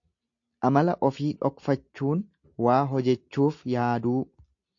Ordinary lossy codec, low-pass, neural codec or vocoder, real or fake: AAC, 48 kbps; 7.2 kHz; none; real